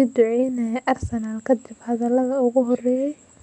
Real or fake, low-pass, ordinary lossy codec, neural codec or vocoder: real; 10.8 kHz; none; none